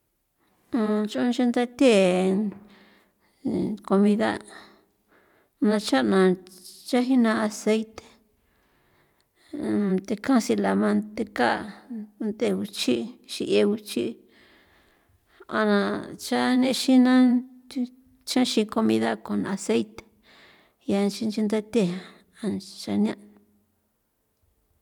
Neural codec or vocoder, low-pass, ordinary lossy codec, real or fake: vocoder, 44.1 kHz, 128 mel bands every 512 samples, BigVGAN v2; 19.8 kHz; none; fake